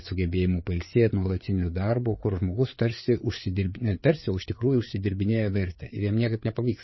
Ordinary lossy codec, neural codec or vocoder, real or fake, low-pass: MP3, 24 kbps; codec, 16 kHz, 4 kbps, FreqCodec, larger model; fake; 7.2 kHz